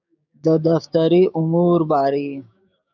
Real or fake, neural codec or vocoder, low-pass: fake; codec, 16 kHz, 6 kbps, DAC; 7.2 kHz